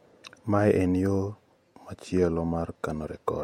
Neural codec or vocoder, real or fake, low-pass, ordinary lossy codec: vocoder, 48 kHz, 128 mel bands, Vocos; fake; 19.8 kHz; MP3, 64 kbps